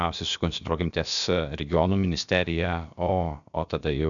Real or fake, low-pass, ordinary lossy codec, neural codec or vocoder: fake; 7.2 kHz; MP3, 96 kbps; codec, 16 kHz, about 1 kbps, DyCAST, with the encoder's durations